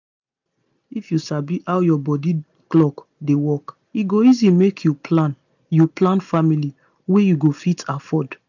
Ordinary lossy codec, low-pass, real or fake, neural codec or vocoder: none; 7.2 kHz; real; none